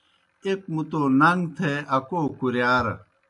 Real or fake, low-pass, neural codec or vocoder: real; 10.8 kHz; none